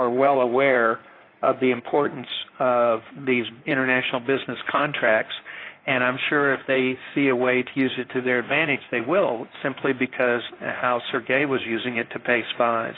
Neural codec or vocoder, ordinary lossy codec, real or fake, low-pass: codec, 16 kHz in and 24 kHz out, 2.2 kbps, FireRedTTS-2 codec; AAC, 24 kbps; fake; 5.4 kHz